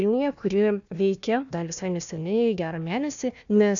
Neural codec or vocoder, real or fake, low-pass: codec, 16 kHz, 1 kbps, FunCodec, trained on Chinese and English, 50 frames a second; fake; 7.2 kHz